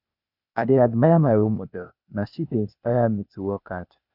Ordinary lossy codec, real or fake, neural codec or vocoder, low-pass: none; fake; codec, 16 kHz, 0.8 kbps, ZipCodec; 5.4 kHz